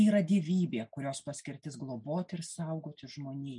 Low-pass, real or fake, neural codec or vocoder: 10.8 kHz; real; none